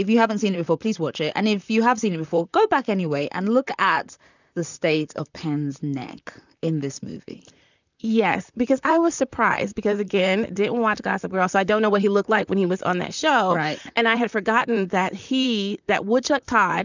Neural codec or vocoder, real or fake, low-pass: vocoder, 44.1 kHz, 128 mel bands, Pupu-Vocoder; fake; 7.2 kHz